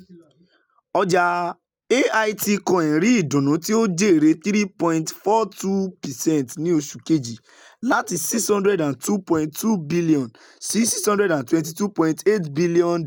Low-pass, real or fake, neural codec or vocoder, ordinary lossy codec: none; real; none; none